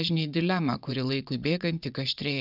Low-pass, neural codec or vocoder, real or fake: 5.4 kHz; vocoder, 22.05 kHz, 80 mel bands, Vocos; fake